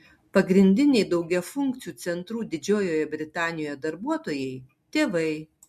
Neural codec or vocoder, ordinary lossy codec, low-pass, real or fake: none; MP3, 64 kbps; 14.4 kHz; real